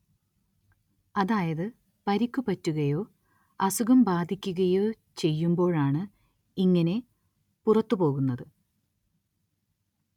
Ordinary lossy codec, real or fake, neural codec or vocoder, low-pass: none; real; none; 19.8 kHz